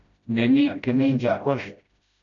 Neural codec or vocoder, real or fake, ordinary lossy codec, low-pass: codec, 16 kHz, 0.5 kbps, FreqCodec, smaller model; fake; MP3, 64 kbps; 7.2 kHz